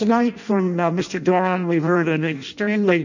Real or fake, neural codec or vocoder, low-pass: fake; codec, 16 kHz in and 24 kHz out, 0.6 kbps, FireRedTTS-2 codec; 7.2 kHz